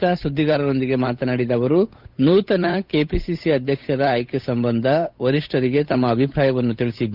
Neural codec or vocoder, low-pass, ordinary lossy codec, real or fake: codec, 16 kHz, 8 kbps, FunCodec, trained on Chinese and English, 25 frames a second; 5.4 kHz; none; fake